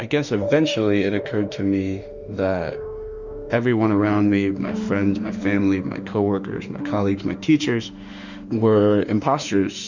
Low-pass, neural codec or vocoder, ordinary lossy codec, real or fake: 7.2 kHz; autoencoder, 48 kHz, 32 numbers a frame, DAC-VAE, trained on Japanese speech; Opus, 64 kbps; fake